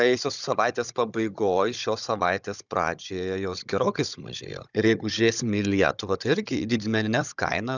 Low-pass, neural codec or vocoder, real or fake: 7.2 kHz; codec, 16 kHz, 16 kbps, FunCodec, trained on Chinese and English, 50 frames a second; fake